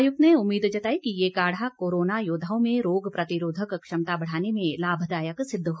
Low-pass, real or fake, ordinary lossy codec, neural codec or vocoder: none; real; none; none